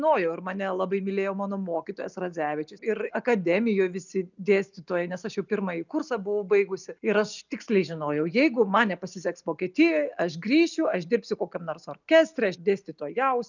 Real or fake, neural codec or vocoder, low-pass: real; none; 7.2 kHz